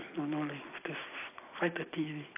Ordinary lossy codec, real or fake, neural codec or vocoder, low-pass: AAC, 24 kbps; fake; vocoder, 44.1 kHz, 128 mel bands every 256 samples, BigVGAN v2; 3.6 kHz